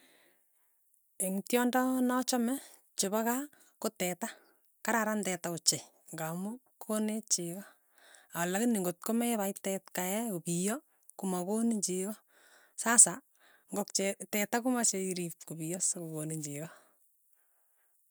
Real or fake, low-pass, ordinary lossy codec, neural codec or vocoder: real; none; none; none